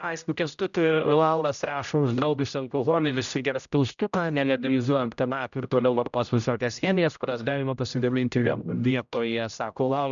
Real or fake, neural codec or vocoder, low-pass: fake; codec, 16 kHz, 0.5 kbps, X-Codec, HuBERT features, trained on general audio; 7.2 kHz